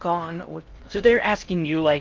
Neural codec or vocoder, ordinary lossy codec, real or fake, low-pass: codec, 16 kHz in and 24 kHz out, 0.6 kbps, FocalCodec, streaming, 4096 codes; Opus, 24 kbps; fake; 7.2 kHz